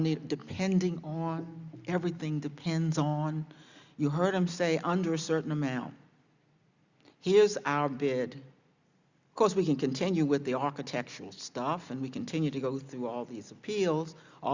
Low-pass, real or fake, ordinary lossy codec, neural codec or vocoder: 7.2 kHz; real; Opus, 64 kbps; none